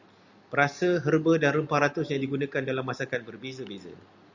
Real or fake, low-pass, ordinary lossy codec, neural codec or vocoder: real; 7.2 kHz; Opus, 64 kbps; none